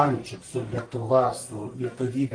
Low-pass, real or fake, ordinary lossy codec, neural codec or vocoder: 9.9 kHz; fake; Opus, 32 kbps; codec, 44.1 kHz, 1.7 kbps, Pupu-Codec